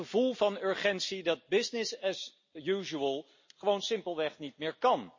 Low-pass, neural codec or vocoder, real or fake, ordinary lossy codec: 7.2 kHz; none; real; MP3, 32 kbps